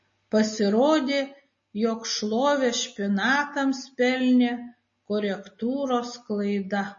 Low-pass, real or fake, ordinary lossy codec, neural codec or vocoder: 7.2 kHz; real; MP3, 32 kbps; none